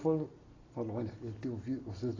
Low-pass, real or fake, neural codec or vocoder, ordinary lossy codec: 7.2 kHz; real; none; AAC, 32 kbps